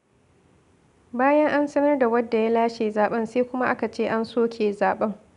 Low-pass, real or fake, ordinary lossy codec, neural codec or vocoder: 10.8 kHz; real; none; none